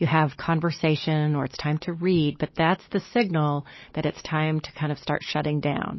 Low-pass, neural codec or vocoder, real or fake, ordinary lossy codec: 7.2 kHz; none; real; MP3, 24 kbps